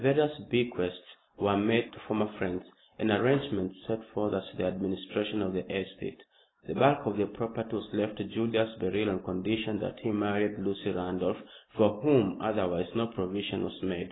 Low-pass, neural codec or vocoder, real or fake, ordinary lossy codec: 7.2 kHz; none; real; AAC, 16 kbps